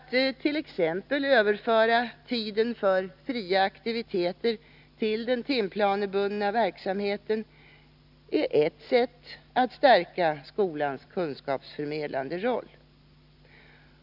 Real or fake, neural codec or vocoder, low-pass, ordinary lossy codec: real; none; 5.4 kHz; none